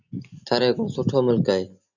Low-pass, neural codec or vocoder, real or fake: 7.2 kHz; none; real